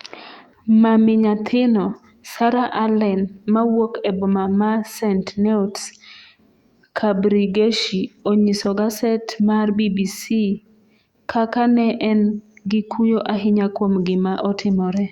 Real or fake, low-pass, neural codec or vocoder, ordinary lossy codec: fake; 19.8 kHz; autoencoder, 48 kHz, 128 numbers a frame, DAC-VAE, trained on Japanese speech; Opus, 64 kbps